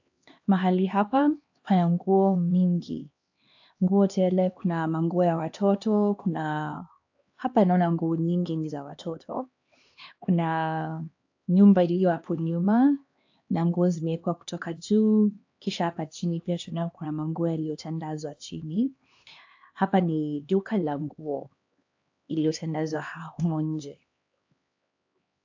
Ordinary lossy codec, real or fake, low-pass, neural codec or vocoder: AAC, 48 kbps; fake; 7.2 kHz; codec, 16 kHz, 2 kbps, X-Codec, HuBERT features, trained on LibriSpeech